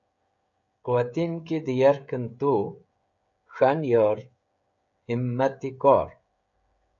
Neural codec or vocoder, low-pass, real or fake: codec, 16 kHz, 16 kbps, FreqCodec, smaller model; 7.2 kHz; fake